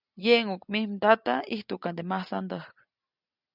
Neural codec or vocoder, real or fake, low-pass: none; real; 5.4 kHz